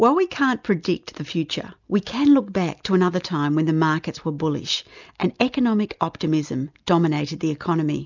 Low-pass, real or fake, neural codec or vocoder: 7.2 kHz; real; none